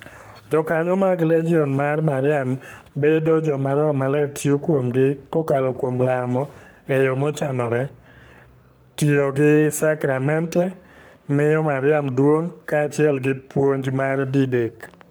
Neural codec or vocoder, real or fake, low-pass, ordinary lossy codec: codec, 44.1 kHz, 3.4 kbps, Pupu-Codec; fake; none; none